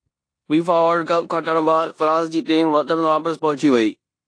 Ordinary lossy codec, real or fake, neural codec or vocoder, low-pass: AAC, 48 kbps; fake; codec, 16 kHz in and 24 kHz out, 0.9 kbps, LongCat-Audio-Codec, four codebook decoder; 9.9 kHz